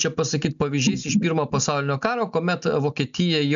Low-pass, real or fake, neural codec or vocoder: 7.2 kHz; real; none